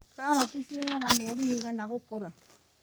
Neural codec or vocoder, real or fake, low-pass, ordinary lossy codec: codec, 44.1 kHz, 3.4 kbps, Pupu-Codec; fake; none; none